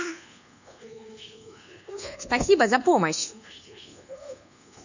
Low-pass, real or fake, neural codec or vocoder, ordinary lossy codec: 7.2 kHz; fake; codec, 24 kHz, 1.2 kbps, DualCodec; none